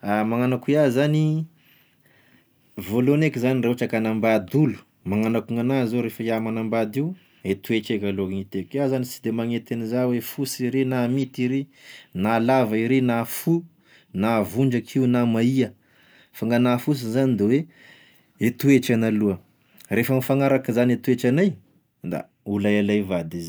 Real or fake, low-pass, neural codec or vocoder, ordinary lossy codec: real; none; none; none